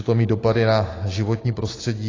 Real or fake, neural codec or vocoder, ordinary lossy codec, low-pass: real; none; AAC, 32 kbps; 7.2 kHz